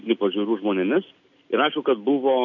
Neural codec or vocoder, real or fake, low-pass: none; real; 7.2 kHz